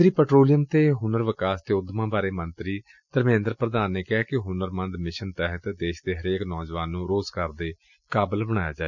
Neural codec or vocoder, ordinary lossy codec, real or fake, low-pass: none; none; real; 7.2 kHz